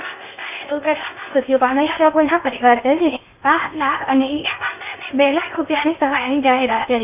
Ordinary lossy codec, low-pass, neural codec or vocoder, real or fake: none; 3.6 kHz; codec, 16 kHz in and 24 kHz out, 0.6 kbps, FocalCodec, streaming, 2048 codes; fake